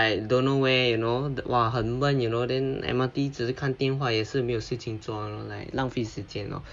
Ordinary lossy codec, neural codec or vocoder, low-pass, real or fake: none; none; 9.9 kHz; real